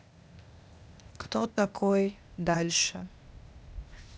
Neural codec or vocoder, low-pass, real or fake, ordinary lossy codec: codec, 16 kHz, 0.8 kbps, ZipCodec; none; fake; none